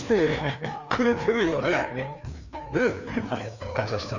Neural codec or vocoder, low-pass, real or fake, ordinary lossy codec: codec, 16 kHz, 2 kbps, FreqCodec, larger model; 7.2 kHz; fake; none